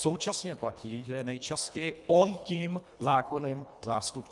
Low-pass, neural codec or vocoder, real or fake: 10.8 kHz; codec, 24 kHz, 1.5 kbps, HILCodec; fake